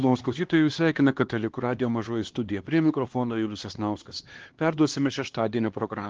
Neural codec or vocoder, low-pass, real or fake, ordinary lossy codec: codec, 16 kHz, 2 kbps, X-Codec, HuBERT features, trained on LibriSpeech; 7.2 kHz; fake; Opus, 16 kbps